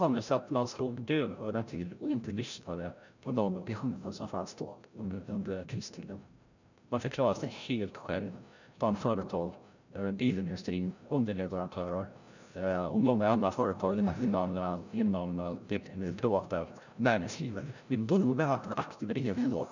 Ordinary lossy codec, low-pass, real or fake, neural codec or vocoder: none; 7.2 kHz; fake; codec, 16 kHz, 0.5 kbps, FreqCodec, larger model